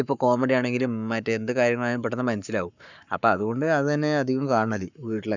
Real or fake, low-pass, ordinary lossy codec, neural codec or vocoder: fake; 7.2 kHz; none; codec, 44.1 kHz, 7.8 kbps, Pupu-Codec